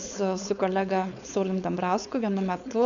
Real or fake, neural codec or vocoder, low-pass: fake; codec, 16 kHz, 4.8 kbps, FACodec; 7.2 kHz